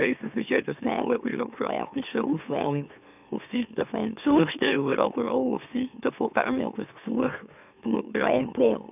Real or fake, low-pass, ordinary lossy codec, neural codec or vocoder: fake; 3.6 kHz; none; autoencoder, 44.1 kHz, a latent of 192 numbers a frame, MeloTTS